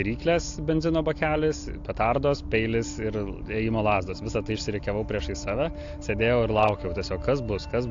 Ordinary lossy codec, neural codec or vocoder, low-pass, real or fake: AAC, 48 kbps; none; 7.2 kHz; real